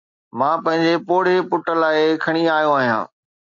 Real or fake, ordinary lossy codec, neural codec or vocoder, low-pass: real; AAC, 64 kbps; none; 7.2 kHz